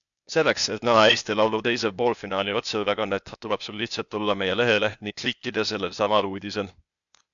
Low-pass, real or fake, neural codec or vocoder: 7.2 kHz; fake; codec, 16 kHz, 0.8 kbps, ZipCodec